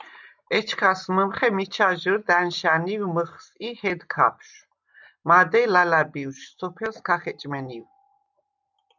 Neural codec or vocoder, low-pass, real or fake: none; 7.2 kHz; real